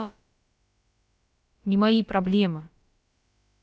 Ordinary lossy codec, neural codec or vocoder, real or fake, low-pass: none; codec, 16 kHz, about 1 kbps, DyCAST, with the encoder's durations; fake; none